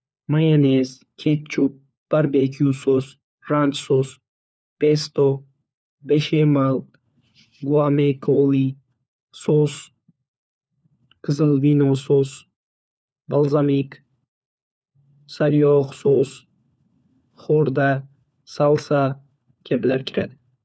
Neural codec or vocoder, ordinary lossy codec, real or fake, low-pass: codec, 16 kHz, 4 kbps, FunCodec, trained on LibriTTS, 50 frames a second; none; fake; none